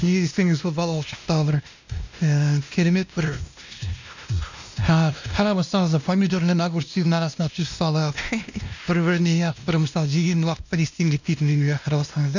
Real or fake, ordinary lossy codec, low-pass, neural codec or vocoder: fake; none; 7.2 kHz; codec, 16 kHz, 1 kbps, X-Codec, WavLM features, trained on Multilingual LibriSpeech